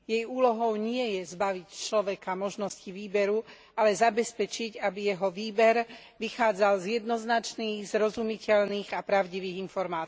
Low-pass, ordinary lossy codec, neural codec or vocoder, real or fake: none; none; none; real